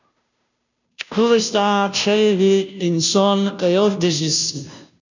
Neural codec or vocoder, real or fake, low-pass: codec, 16 kHz, 0.5 kbps, FunCodec, trained on Chinese and English, 25 frames a second; fake; 7.2 kHz